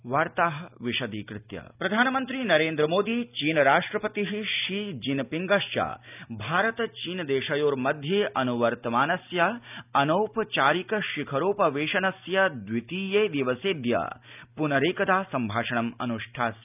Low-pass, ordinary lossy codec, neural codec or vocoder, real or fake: 3.6 kHz; none; none; real